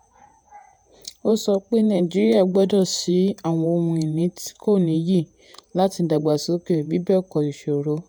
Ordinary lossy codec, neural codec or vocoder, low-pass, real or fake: none; vocoder, 48 kHz, 128 mel bands, Vocos; none; fake